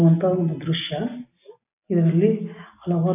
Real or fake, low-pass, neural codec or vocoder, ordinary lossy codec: real; 3.6 kHz; none; none